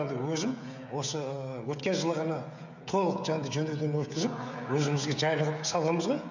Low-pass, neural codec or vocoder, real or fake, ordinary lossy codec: 7.2 kHz; codec, 16 kHz, 16 kbps, FreqCodec, smaller model; fake; none